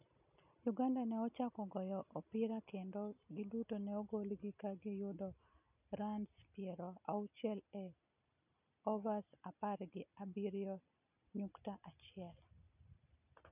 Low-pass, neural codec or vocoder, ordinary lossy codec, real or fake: 3.6 kHz; none; none; real